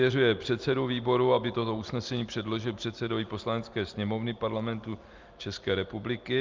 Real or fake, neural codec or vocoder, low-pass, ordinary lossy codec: real; none; 7.2 kHz; Opus, 32 kbps